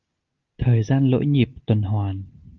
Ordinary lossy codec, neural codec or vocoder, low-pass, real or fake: Opus, 32 kbps; none; 7.2 kHz; real